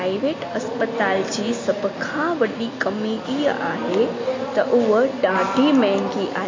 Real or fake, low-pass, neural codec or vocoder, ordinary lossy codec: real; 7.2 kHz; none; AAC, 32 kbps